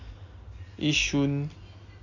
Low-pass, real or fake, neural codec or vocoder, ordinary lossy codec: 7.2 kHz; real; none; none